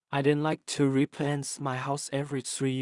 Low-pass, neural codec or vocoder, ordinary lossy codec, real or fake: 10.8 kHz; codec, 16 kHz in and 24 kHz out, 0.4 kbps, LongCat-Audio-Codec, two codebook decoder; Opus, 64 kbps; fake